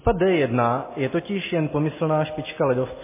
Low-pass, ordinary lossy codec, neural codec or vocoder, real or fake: 3.6 kHz; MP3, 16 kbps; none; real